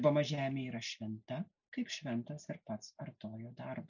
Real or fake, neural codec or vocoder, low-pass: real; none; 7.2 kHz